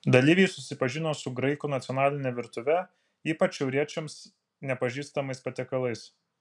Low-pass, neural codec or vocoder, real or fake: 10.8 kHz; none; real